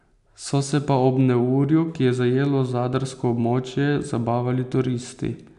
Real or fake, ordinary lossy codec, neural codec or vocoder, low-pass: real; none; none; 10.8 kHz